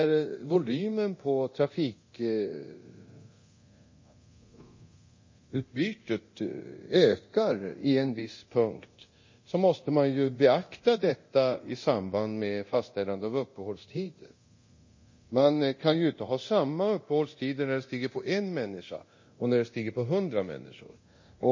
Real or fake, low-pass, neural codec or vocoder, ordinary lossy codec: fake; 7.2 kHz; codec, 24 kHz, 0.9 kbps, DualCodec; MP3, 32 kbps